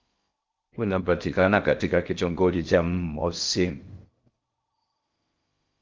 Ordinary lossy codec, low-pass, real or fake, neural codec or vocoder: Opus, 32 kbps; 7.2 kHz; fake; codec, 16 kHz in and 24 kHz out, 0.6 kbps, FocalCodec, streaming, 4096 codes